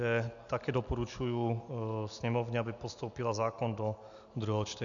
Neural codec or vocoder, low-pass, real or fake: none; 7.2 kHz; real